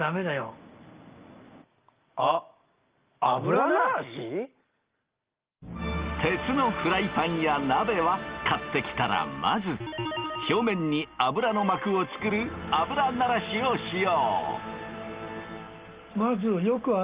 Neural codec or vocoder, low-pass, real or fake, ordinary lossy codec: none; 3.6 kHz; real; Opus, 32 kbps